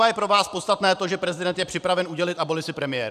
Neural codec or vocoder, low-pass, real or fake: vocoder, 44.1 kHz, 128 mel bands every 512 samples, BigVGAN v2; 14.4 kHz; fake